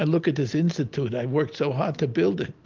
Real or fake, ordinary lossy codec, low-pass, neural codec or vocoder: real; Opus, 32 kbps; 7.2 kHz; none